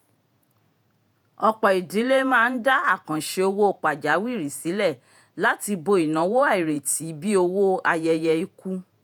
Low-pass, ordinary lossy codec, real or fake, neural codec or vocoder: none; none; fake; vocoder, 48 kHz, 128 mel bands, Vocos